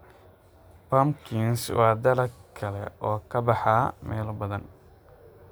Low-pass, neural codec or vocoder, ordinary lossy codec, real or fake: none; none; none; real